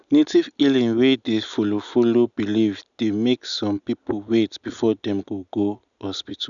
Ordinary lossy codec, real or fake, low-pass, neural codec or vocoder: none; real; 7.2 kHz; none